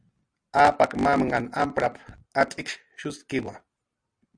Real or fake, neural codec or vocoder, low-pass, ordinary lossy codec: real; none; 9.9 kHz; Opus, 64 kbps